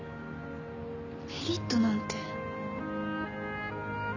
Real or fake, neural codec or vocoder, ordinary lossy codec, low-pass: real; none; none; 7.2 kHz